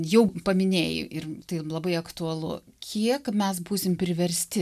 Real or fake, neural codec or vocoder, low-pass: real; none; 14.4 kHz